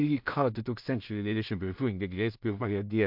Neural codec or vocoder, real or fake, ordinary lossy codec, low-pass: codec, 16 kHz in and 24 kHz out, 0.4 kbps, LongCat-Audio-Codec, two codebook decoder; fake; MP3, 48 kbps; 5.4 kHz